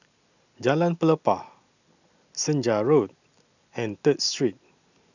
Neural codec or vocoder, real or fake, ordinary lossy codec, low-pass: none; real; none; 7.2 kHz